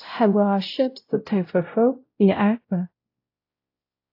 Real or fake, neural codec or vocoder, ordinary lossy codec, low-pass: fake; codec, 16 kHz, 0.5 kbps, X-Codec, WavLM features, trained on Multilingual LibriSpeech; AAC, 32 kbps; 5.4 kHz